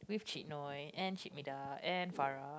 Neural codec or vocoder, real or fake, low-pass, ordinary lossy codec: none; real; none; none